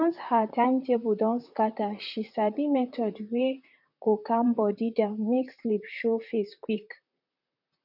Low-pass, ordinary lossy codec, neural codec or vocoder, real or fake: 5.4 kHz; none; vocoder, 44.1 kHz, 128 mel bands, Pupu-Vocoder; fake